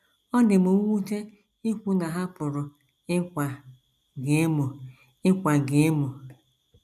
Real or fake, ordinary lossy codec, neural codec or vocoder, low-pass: real; none; none; 14.4 kHz